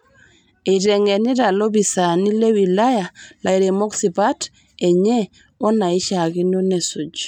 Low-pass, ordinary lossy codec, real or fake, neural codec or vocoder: 19.8 kHz; none; real; none